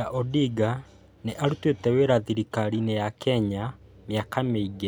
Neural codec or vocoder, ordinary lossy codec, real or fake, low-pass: none; none; real; none